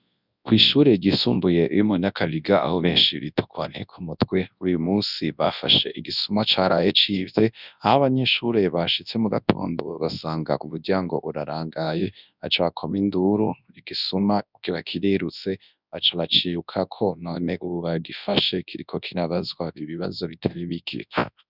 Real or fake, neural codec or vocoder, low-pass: fake; codec, 24 kHz, 0.9 kbps, WavTokenizer, large speech release; 5.4 kHz